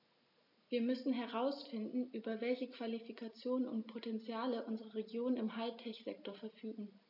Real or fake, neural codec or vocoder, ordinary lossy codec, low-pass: real; none; MP3, 48 kbps; 5.4 kHz